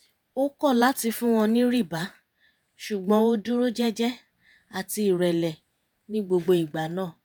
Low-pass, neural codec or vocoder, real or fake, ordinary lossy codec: none; vocoder, 48 kHz, 128 mel bands, Vocos; fake; none